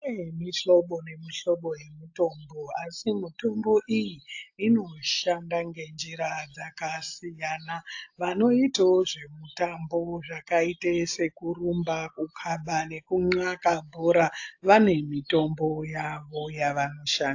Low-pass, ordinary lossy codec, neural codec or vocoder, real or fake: 7.2 kHz; AAC, 48 kbps; none; real